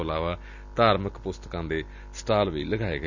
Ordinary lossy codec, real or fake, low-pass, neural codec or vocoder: none; real; 7.2 kHz; none